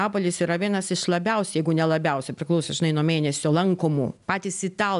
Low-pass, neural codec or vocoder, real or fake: 10.8 kHz; none; real